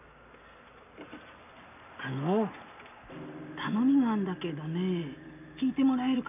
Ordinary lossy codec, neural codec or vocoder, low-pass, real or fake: none; none; 3.6 kHz; real